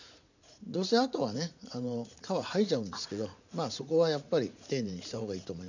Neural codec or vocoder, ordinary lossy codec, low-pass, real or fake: vocoder, 44.1 kHz, 80 mel bands, Vocos; none; 7.2 kHz; fake